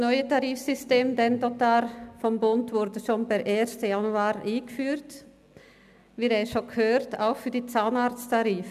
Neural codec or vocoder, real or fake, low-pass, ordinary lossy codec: none; real; 14.4 kHz; none